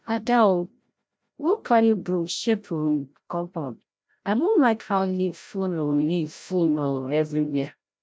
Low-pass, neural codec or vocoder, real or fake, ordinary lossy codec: none; codec, 16 kHz, 0.5 kbps, FreqCodec, larger model; fake; none